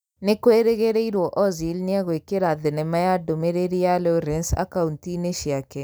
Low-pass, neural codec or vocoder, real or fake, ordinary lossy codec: none; none; real; none